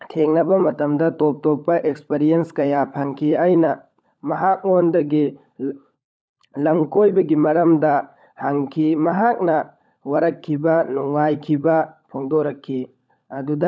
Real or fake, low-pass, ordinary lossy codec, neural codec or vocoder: fake; none; none; codec, 16 kHz, 16 kbps, FunCodec, trained on LibriTTS, 50 frames a second